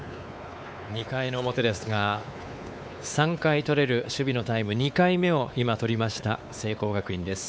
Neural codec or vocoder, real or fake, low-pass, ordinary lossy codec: codec, 16 kHz, 4 kbps, X-Codec, WavLM features, trained on Multilingual LibriSpeech; fake; none; none